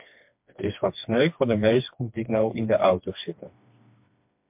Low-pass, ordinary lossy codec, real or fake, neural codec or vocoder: 3.6 kHz; MP3, 32 kbps; fake; codec, 16 kHz, 2 kbps, FreqCodec, smaller model